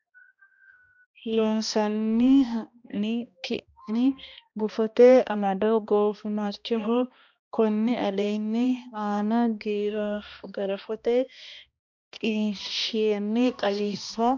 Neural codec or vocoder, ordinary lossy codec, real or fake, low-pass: codec, 16 kHz, 1 kbps, X-Codec, HuBERT features, trained on balanced general audio; MP3, 64 kbps; fake; 7.2 kHz